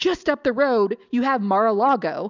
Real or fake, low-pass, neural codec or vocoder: real; 7.2 kHz; none